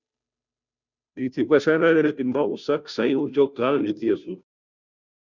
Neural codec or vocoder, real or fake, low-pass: codec, 16 kHz, 0.5 kbps, FunCodec, trained on Chinese and English, 25 frames a second; fake; 7.2 kHz